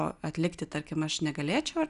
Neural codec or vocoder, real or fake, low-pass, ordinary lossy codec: none; real; 10.8 kHz; Opus, 64 kbps